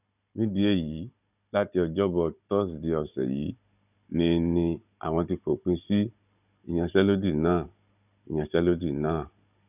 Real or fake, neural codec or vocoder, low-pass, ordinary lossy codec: fake; codec, 16 kHz, 16 kbps, FunCodec, trained on Chinese and English, 50 frames a second; 3.6 kHz; none